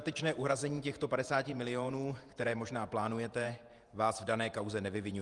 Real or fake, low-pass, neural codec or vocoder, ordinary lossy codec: fake; 10.8 kHz; vocoder, 48 kHz, 128 mel bands, Vocos; Opus, 32 kbps